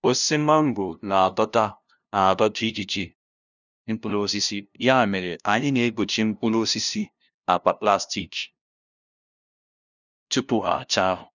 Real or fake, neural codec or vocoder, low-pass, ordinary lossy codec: fake; codec, 16 kHz, 0.5 kbps, FunCodec, trained on LibriTTS, 25 frames a second; 7.2 kHz; none